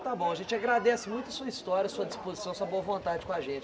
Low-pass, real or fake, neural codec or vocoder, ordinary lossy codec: none; real; none; none